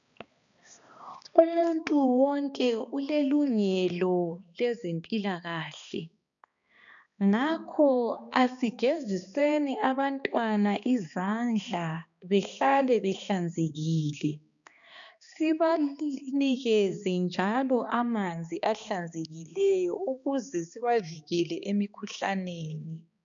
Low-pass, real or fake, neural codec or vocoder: 7.2 kHz; fake; codec, 16 kHz, 2 kbps, X-Codec, HuBERT features, trained on balanced general audio